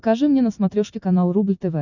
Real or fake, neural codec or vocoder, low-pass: real; none; 7.2 kHz